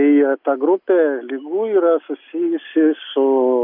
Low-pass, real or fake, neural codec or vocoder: 5.4 kHz; real; none